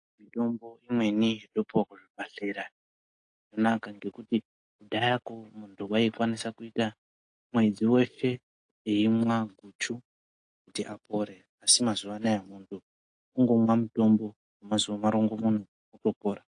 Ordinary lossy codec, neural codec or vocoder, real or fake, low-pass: AAC, 48 kbps; none; real; 9.9 kHz